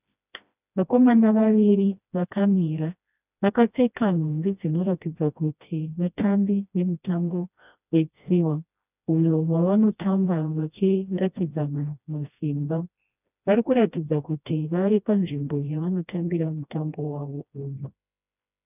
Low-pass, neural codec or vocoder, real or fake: 3.6 kHz; codec, 16 kHz, 1 kbps, FreqCodec, smaller model; fake